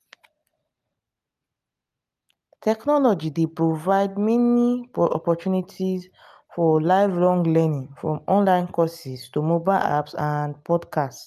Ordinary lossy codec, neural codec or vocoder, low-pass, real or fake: none; none; 14.4 kHz; real